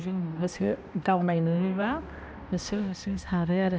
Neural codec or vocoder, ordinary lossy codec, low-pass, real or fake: codec, 16 kHz, 1 kbps, X-Codec, HuBERT features, trained on balanced general audio; none; none; fake